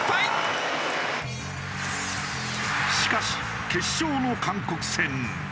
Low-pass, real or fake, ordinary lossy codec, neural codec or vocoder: none; real; none; none